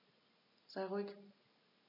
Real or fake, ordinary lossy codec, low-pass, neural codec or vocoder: real; none; 5.4 kHz; none